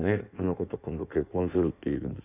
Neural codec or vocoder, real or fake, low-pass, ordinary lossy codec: codec, 16 kHz in and 24 kHz out, 1.1 kbps, FireRedTTS-2 codec; fake; 3.6 kHz; none